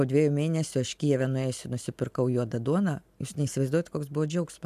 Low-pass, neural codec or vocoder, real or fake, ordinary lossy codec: 14.4 kHz; none; real; AAC, 96 kbps